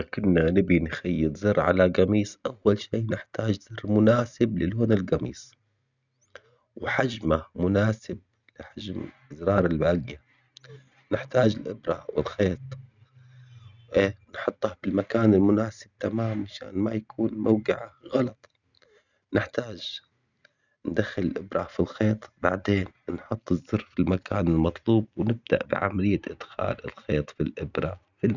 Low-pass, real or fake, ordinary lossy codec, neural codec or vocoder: 7.2 kHz; real; none; none